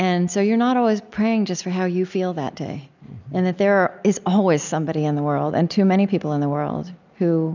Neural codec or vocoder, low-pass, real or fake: none; 7.2 kHz; real